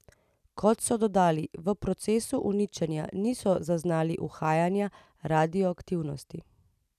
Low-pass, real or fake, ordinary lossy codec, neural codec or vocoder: 14.4 kHz; real; none; none